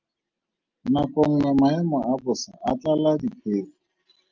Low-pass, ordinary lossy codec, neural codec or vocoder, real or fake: 7.2 kHz; Opus, 24 kbps; none; real